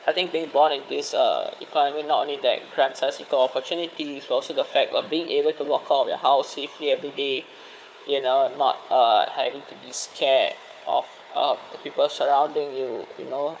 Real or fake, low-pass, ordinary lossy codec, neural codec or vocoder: fake; none; none; codec, 16 kHz, 4 kbps, FunCodec, trained on Chinese and English, 50 frames a second